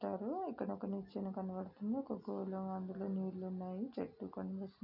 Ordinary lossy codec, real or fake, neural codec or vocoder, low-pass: none; real; none; 5.4 kHz